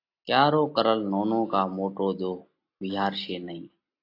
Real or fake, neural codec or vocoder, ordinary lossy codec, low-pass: real; none; AAC, 32 kbps; 5.4 kHz